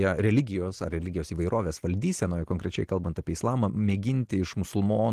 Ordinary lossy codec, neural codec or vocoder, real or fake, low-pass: Opus, 16 kbps; none; real; 14.4 kHz